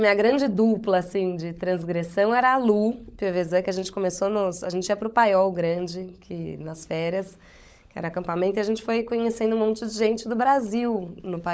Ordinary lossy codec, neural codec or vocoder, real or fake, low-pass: none; codec, 16 kHz, 16 kbps, FunCodec, trained on LibriTTS, 50 frames a second; fake; none